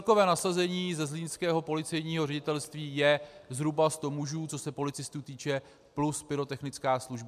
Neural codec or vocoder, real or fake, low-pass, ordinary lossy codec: none; real; 14.4 kHz; MP3, 96 kbps